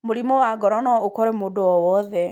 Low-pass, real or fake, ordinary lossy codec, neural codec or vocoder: 19.8 kHz; real; Opus, 32 kbps; none